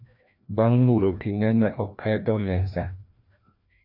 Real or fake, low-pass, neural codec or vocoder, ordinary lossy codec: fake; 5.4 kHz; codec, 16 kHz, 1 kbps, FreqCodec, larger model; AAC, 48 kbps